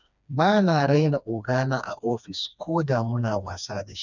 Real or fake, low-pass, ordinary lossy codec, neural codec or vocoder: fake; 7.2 kHz; none; codec, 16 kHz, 2 kbps, FreqCodec, smaller model